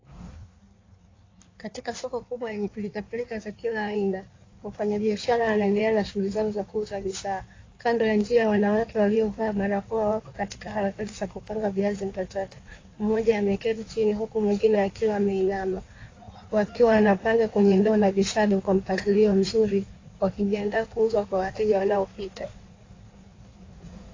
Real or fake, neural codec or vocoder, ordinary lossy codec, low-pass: fake; codec, 16 kHz in and 24 kHz out, 1.1 kbps, FireRedTTS-2 codec; AAC, 32 kbps; 7.2 kHz